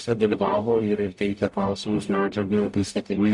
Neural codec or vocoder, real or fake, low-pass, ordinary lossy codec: codec, 44.1 kHz, 0.9 kbps, DAC; fake; 10.8 kHz; MP3, 64 kbps